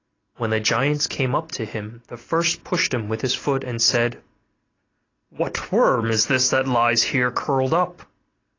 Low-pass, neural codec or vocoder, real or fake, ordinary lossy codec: 7.2 kHz; none; real; AAC, 32 kbps